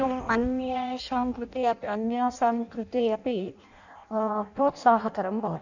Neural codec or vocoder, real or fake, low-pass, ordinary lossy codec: codec, 16 kHz in and 24 kHz out, 0.6 kbps, FireRedTTS-2 codec; fake; 7.2 kHz; none